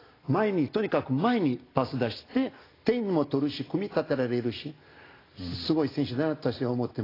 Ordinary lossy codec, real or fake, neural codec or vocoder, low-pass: AAC, 24 kbps; real; none; 5.4 kHz